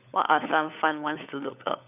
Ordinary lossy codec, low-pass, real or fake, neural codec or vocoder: none; 3.6 kHz; fake; codec, 16 kHz, 16 kbps, FunCodec, trained on LibriTTS, 50 frames a second